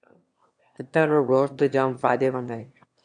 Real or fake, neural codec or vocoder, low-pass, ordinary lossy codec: fake; autoencoder, 22.05 kHz, a latent of 192 numbers a frame, VITS, trained on one speaker; 9.9 kHz; AAC, 64 kbps